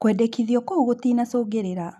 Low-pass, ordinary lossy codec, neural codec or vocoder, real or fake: none; none; none; real